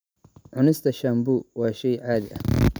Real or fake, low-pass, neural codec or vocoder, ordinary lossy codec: real; none; none; none